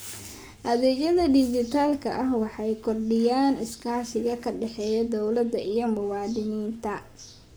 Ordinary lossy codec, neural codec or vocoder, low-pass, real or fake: none; codec, 44.1 kHz, 7.8 kbps, Pupu-Codec; none; fake